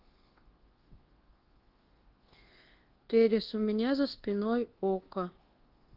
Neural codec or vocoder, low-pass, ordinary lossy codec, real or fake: codec, 24 kHz, 1.2 kbps, DualCodec; 5.4 kHz; Opus, 16 kbps; fake